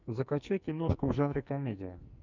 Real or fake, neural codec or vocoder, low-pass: fake; codec, 44.1 kHz, 2.6 kbps, SNAC; 7.2 kHz